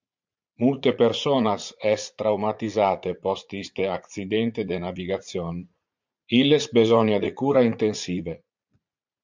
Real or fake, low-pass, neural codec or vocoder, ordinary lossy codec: fake; 7.2 kHz; vocoder, 44.1 kHz, 80 mel bands, Vocos; MP3, 64 kbps